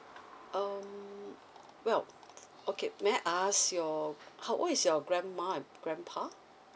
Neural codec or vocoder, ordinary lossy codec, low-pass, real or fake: none; none; none; real